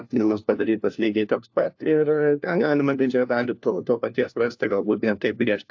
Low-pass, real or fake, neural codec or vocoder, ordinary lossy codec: 7.2 kHz; fake; codec, 16 kHz, 1 kbps, FunCodec, trained on LibriTTS, 50 frames a second; AAC, 48 kbps